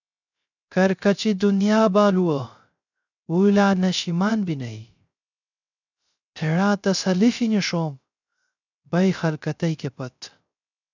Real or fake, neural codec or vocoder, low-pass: fake; codec, 16 kHz, 0.3 kbps, FocalCodec; 7.2 kHz